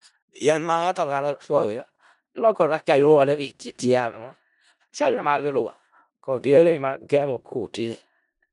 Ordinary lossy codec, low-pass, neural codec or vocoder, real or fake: none; 10.8 kHz; codec, 16 kHz in and 24 kHz out, 0.4 kbps, LongCat-Audio-Codec, four codebook decoder; fake